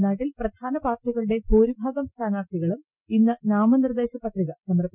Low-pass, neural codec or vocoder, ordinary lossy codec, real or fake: 3.6 kHz; none; none; real